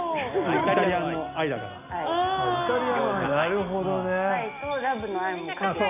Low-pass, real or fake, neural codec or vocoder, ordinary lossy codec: 3.6 kHz; real; none; none